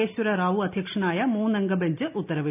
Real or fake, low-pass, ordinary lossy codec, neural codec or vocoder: real; 3.6 kHz; none; none